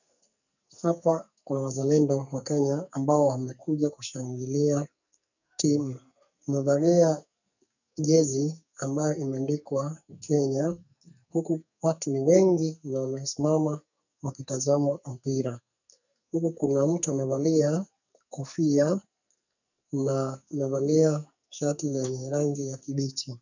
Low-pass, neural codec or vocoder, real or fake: 7.2 kHz; codec, 44.1 kHz, 2.6 kbps, SNAC; fake